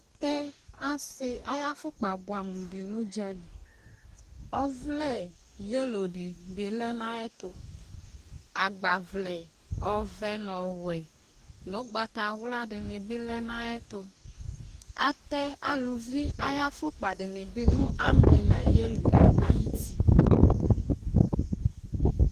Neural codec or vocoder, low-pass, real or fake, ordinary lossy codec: codec, 44.1 kHz, 2.6 kbps, DAC; 14.4 kHz; fake; Opus, 24 kbps